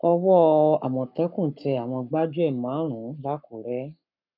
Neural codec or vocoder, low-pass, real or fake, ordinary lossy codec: codec, 44.1 kHz, 7.8 kbps, Pupu-Codec; 5.4 kHz; fake; none